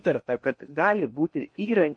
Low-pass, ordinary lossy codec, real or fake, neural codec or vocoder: 9.9 kHz; MP3, 48 kbps; fake; codec, 16 kHz in and 24 kHz out, 0.8 kbps, FocalCodec, streaming, 65536 codes